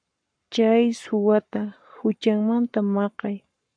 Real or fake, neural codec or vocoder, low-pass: fake; codec, 44.1 kHz, 7.8 kbps, Pupu-Codec; 9.9 kHz